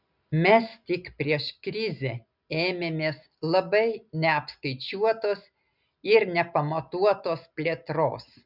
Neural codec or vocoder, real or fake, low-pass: none; real; 5.4 kHz